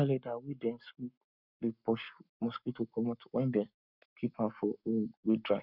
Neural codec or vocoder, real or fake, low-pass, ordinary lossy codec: codec, 44.1 kHz, 7.8 kbps, Pupu-Codec; fake; 5.4 kHz; none